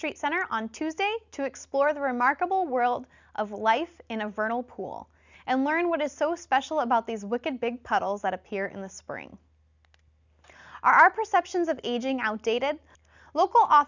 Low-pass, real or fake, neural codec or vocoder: 7.2 kHz; real; none